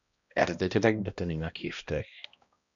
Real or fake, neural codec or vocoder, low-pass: fake; codec, 16 kHz, 1 kbps, X-Codec, HuBERT features, trained on balanced general audio; 7.2 kHz